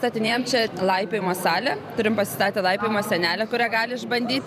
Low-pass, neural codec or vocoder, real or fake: 14.4 kHz; vocoder, 44.1 kHz, 128 mel bands every 512 samples, BigVGAN v2; fake